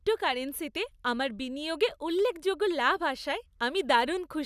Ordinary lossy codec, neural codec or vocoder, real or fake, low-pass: none; none; real; 14.4 kHz